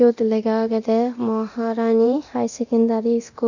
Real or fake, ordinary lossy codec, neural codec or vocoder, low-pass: fake; none; codec, 24 kHz, 0.9 kbps, DualCodec; 7.2 kHz